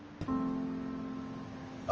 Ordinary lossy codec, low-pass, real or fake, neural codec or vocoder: Opus, 24 kbps; 7.2 kHz; real; none